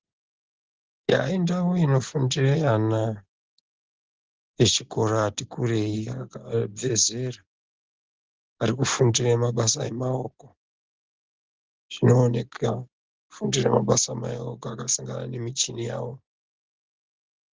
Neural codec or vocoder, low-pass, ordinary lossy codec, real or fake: none; 7.2 kHz; Opus, 16 kbps; real